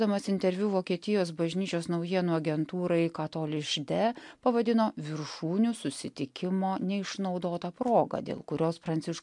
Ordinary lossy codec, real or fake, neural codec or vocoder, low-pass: MP3, 64 kbps; real; none; 10.8 kHz